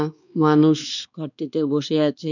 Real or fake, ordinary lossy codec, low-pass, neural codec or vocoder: fake; none; 7.2 kHz; codec, 16 kHz, 2 kbps, X-Codec, WavLM features, trained on Multilingual LibriSpeech